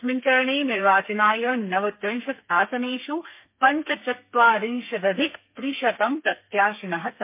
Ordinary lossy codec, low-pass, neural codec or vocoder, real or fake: MP3, 24 kbps; 3.6 kHz; codec, 32 kHz, 1.9 kbps, SNAC; fake